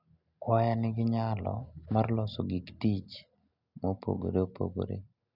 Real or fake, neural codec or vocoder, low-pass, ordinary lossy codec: real; none; 5.4 kHz; none